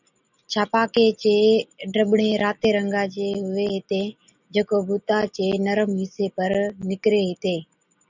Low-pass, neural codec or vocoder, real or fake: 7.2 kHz; none; real